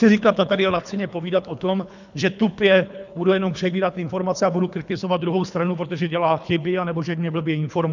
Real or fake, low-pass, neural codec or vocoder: fake; 7.2 kHz; codec, 24 kHz, 3 kbps, HILCodec